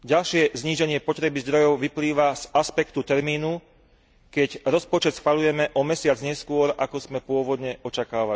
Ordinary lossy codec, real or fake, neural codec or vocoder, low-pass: none; real; none; none